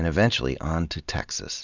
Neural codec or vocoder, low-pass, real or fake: none; 7.2 kHz; real